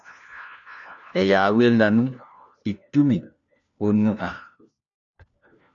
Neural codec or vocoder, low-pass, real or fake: codec, 16 kHz, 1 kbps, FunCodec, trained on LibriTTS, 50 frames a second; 7.2 kHz; fake